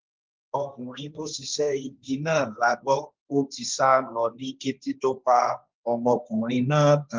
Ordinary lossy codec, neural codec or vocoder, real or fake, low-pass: Opus, 24 kbps; codec, 16 kHz, 1.1 kbps, Voila-Tokenizer; fake; 7.2 kHz